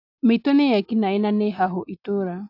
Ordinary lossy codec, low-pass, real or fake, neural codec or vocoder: AAC, 32 kbps; 5.4 kHz; real; none